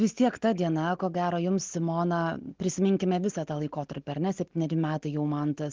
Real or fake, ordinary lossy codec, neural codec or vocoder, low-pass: real; Opus, 16 kbps; none; 7.2 kHz